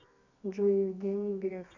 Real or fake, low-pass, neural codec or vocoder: fake; 7.2 kHz; codec, 24 kHz, 0.9 kbps, WavTokenizer, medium music audio release